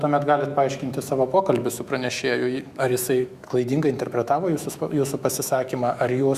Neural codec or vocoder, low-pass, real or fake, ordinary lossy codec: autoencoder, 48 kHz, 128 numbers a frame, DAC-VAE, trained on Japanese speech; 14.4 kHz; fake; Opus, 64 kbps